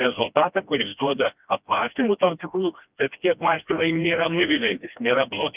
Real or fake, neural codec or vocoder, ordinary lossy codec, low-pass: fake; codec, 16 kHz, 1 kbps, FreqCodec, smaller model; Opus, 24 kbps; 3.6 kHz